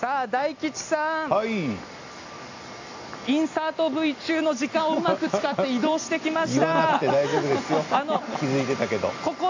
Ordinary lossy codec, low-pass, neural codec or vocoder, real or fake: AAC, 48 kbps; 7.2 kHz; none; real